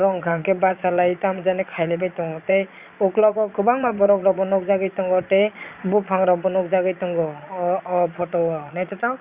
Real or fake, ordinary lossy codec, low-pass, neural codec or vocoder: fake; Opus, 64 kbps; 3.6 kHz; vocoder, 22.05 kHz, 80 mel bands, Vocos